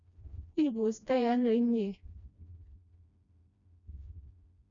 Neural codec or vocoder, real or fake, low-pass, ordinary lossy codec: codec, 16 kHz, 1 kbps, FreqCodec, smaller model; fake; 7.2 kHz; none